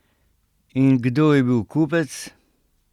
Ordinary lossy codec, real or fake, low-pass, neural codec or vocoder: Opus, 64 kbps; real; 19.8 kHz; none